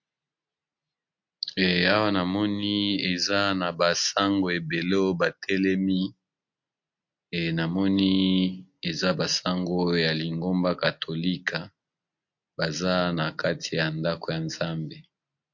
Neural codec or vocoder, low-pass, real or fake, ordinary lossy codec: none; 7.2 kHz; real; MP3, 48 kbps